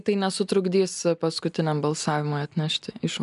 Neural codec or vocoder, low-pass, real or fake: none; 10.8 kHz; real